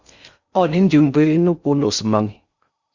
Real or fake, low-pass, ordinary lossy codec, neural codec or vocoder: fake; 7.2 kHz; Opus, 64 kbps; codec, 16 kHz in and 24 kHz out, 0.6 kbps, FocalCodec, streaming, 4096 codes